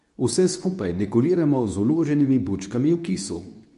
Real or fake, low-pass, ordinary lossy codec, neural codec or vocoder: fake; 10.8 kHz; AAC, 96 kbps; codec, 24 kHz, 0.9 kbps, WavTokenizer, medium speech release version 2